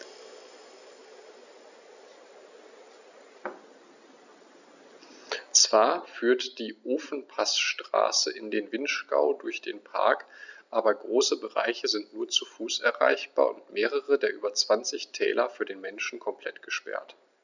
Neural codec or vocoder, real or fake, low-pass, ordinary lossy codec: none; real; 7.2 kHz; none